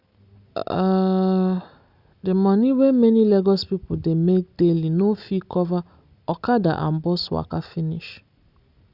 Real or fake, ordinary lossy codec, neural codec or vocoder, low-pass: real; Opus, 64 kbps; none; 5.4 kHz